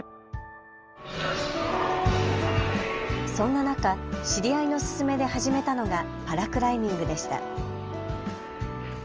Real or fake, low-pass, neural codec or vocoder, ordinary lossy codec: real; 7.2 kHz; none; Opus, 24 kbps